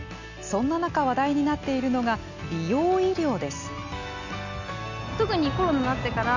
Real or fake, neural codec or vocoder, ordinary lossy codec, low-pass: real; none; none; 7.2 kHz